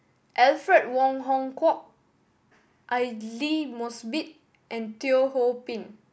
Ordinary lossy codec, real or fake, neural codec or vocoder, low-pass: none; real; none; none